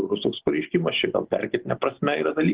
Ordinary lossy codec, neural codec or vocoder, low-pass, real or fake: Opus, 16 kbps; none; 3.6 kHz; real